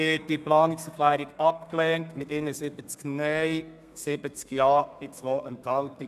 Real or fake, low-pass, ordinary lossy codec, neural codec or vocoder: fake; 14.4 kHz; none; codec, 32 kHz, 1.9 kbps, SNAC